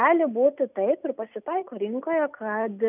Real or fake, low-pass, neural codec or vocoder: real; 3.6 kHz; none